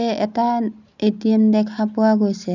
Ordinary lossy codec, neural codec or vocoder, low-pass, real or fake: none; none; 7.2 kHz; real